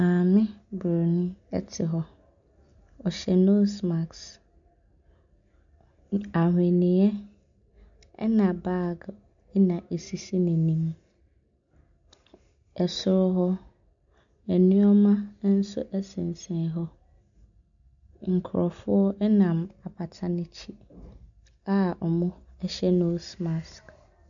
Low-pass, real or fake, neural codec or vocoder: 7.2 kHz; real; none